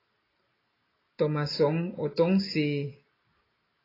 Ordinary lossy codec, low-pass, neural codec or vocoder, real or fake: AAC, 24 kbps; 5.4 kHz; none; real